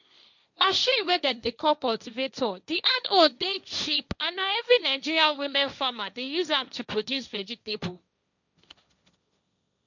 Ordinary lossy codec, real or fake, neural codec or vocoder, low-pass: none; fake; codec, 16 kHz, 1.1 kbps, Voila-Tokenizer; 7.2 kHz